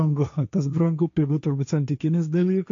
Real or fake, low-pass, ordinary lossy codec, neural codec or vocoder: fake; 7.2 kHz; AAC, 64 kbps; codec, 16 kHz, 1.1 kbps, Voila-Tokenizer